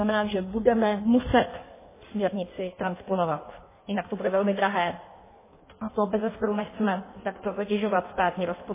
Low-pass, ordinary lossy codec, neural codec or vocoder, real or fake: 3.6 kHz; MP3, 16 kbps; codec, 16 kHz in and 24 kHz out, 1.1 kbps, FireRedTTS-2 codec; fake